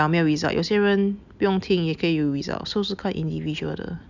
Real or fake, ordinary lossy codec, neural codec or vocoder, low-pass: real; none; none; 7.2 kHz